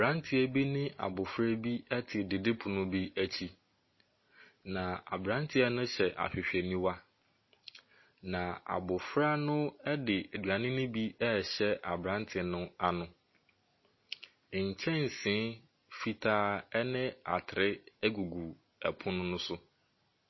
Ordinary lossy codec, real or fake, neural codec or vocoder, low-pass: MP3, 24 kbps; real; none; 7.2 kHz